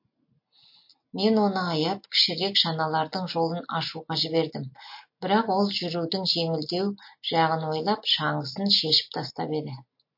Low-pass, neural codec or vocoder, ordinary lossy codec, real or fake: 5.4 kHz; none; MP3, 32 kbps; real